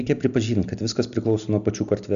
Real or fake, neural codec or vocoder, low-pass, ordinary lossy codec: real; none; 7.2 kHz; MP3, 96 kbps